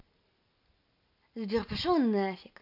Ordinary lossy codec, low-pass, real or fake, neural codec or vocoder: none; 5.4 kHz; real; none